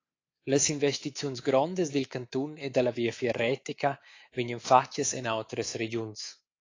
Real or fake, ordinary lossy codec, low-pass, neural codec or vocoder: fake; AAC, 32 kbps; 7.2 kHz; codec, 24 kHz, 3.1 kbps, DualCodec